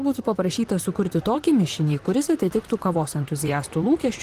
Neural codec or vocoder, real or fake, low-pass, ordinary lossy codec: vocoder, 44.1 kHz, 128 mel bands, Pupu-Vocoder; fake; 14.4 kHz; Opus, 24 kbps